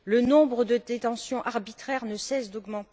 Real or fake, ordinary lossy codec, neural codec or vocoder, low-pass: real; none; none; none